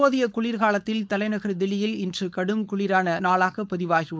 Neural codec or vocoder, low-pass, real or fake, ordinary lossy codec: codec, 16 kHz, 4.8 kbps, FACodec; none; fake; none